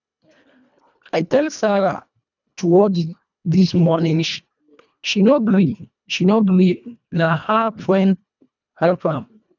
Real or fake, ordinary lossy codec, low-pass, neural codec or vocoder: fake; none; 7.2 kHz; codec, 24 kHz, 1.5 kbps, HILCodec